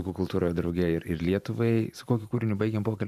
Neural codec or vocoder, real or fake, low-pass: vocoder, 44.1 kHz, 128 mel bands every 512 samples, BigVGAN v2; fake; 14.4 kHz